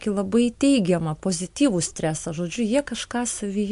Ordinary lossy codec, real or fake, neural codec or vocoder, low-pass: MP3, 64 kbps; real; none; 10.8 kHz